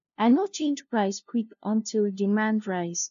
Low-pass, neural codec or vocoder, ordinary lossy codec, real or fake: 7.2 kHz; codec, 16 kHz, 0.5 kbps, FunCodec, trained on LibriTTS, 25 frames a second; AAC, 96 kbps; fake